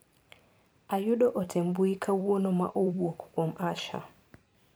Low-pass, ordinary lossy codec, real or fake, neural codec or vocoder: none; none; fake; vocoder, 44.1 kHz, 128 mel bands every 512 samples, BigVGAN v2